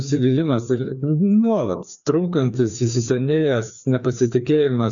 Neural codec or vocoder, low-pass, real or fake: codec, 16 kHz, 2 kbps, FreqCodec, larger model; 7.2 kHz; fake